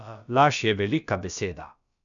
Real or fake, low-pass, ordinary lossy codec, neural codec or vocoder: fake; 7.2 kHz; none; codec, 16 kHz, about 1 kbps, DyCAST, with the encoder's durations